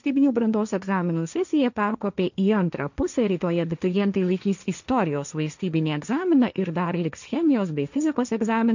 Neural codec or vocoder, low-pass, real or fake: codec, 16 kHz, 1.1 kbps, Voila-Tokenizer; 7.2 kHz; fake